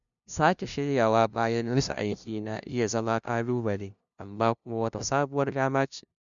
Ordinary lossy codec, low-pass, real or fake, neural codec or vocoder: none; 7.2 kHz; fake; codec, 16 kHz, 0.5 kbps, FunCodec, trained on LibriTTS, 25 frames a second